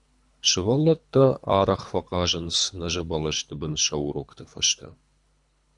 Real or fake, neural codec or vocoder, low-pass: fake; codec, 24 kHz, 3 kbps, HILCodec; 10.8 kHz